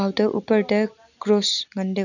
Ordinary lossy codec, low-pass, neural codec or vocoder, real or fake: none; 7.2 kHz; none; real